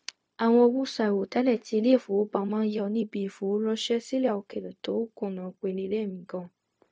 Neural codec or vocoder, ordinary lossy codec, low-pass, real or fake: codec, 16 kHz, 0.4 kbps, LongCat-Audio-Codec; none; none; fake